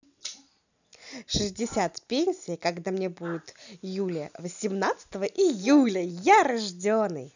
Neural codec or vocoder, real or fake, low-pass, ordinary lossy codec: none; real; 7.2 kHz; none